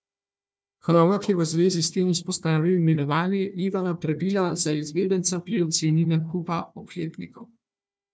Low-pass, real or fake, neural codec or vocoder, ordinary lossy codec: none; fake; codec, 16 kHz, 1 kbps, FunCodec, trained on Chinese and English, 50 frames a second; none